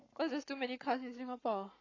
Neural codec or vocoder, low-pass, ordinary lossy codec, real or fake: codec, 44.1 kHz, 7.8 kbps, Pupu-Codec; 7.2 kHz; AAC, 32 kbps; fake